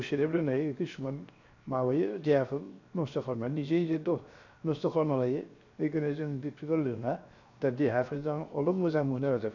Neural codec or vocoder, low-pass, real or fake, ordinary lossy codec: codec, 16 kHz, 0.7 kbps, FocalCodec; 7.2 kHz; fake; none